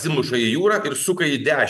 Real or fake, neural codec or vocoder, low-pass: real; none; 14.4 kHz